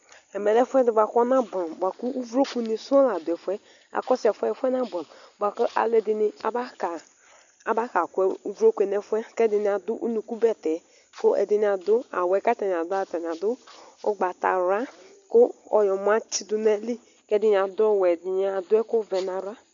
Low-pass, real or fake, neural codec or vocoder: 7.2 kHz; real; none